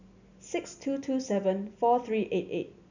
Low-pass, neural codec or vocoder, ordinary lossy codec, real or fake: 7.2 kHz; none; none; real